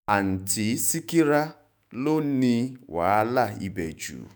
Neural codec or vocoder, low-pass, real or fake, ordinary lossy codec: autoencoder, 48 kHz, 128 numbers a frame, DAC-VAE, trained on Japanese speech; none; fake; none